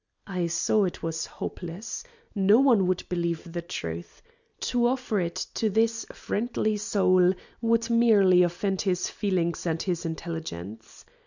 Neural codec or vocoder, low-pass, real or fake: none; 7.2 kHz; real